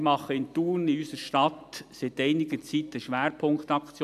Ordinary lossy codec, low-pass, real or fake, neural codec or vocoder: AAC, 96 kbps; 14.4 kHz; real; none